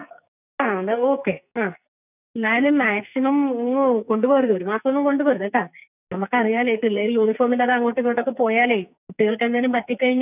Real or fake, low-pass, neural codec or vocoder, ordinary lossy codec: fake; 3.6 kHz; codec, 44.1 kHz, 2.6 kbps, SNAC; none